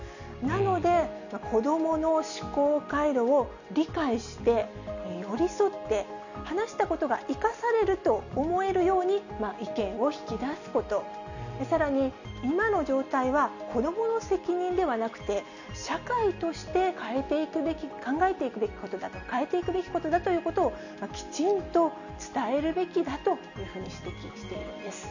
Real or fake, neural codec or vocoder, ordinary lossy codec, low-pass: real; none; none; 7.2 kHz